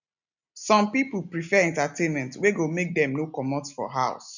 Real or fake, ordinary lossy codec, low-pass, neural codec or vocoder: real; none; 7.2 kHz; none